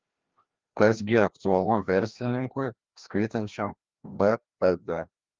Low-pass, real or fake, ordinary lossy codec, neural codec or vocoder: 7.2 kHz; fake; Opus, 32 kbps; codec, 16 kHz, 1 kbps, FreqCodec, larger model